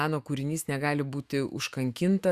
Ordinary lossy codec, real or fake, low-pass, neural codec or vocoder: Opus, 64 kbps; fake; 14.4 kHz; autoencoder, 48 kHz, 128 numbers a frame, DAC-VAE, trained on Japanese speech